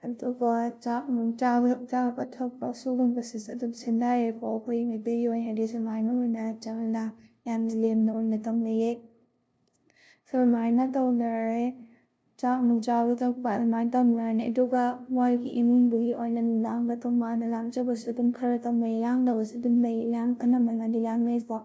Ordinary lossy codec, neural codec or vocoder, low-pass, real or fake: none; codec, 16 kHz, 0.5 kbps, FunCodec, trained on LibriTTS, 25 frames a second; none; fake